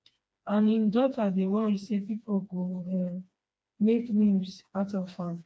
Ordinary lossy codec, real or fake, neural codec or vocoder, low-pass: none; fake; codec, 16 kHz, 2 kbps, FreqCodec, smaller model; none